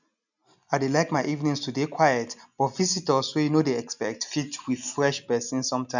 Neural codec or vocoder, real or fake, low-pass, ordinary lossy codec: none; real; 7.2 kHz; none